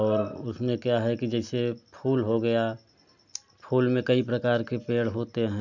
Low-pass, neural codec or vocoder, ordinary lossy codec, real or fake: 7.2 kHz; none; none; real